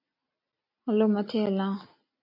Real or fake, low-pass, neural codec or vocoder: real; 5.4 kHz; none